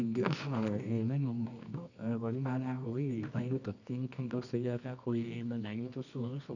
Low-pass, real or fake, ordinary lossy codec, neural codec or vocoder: 7.2 kHz; fake; none; codec, 24 kHz, 0.9 kbps, WavTokenizer, medium music audio release